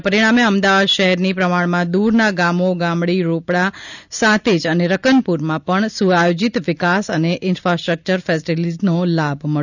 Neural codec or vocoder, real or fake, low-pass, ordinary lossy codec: none; real; 7.2 kHz; none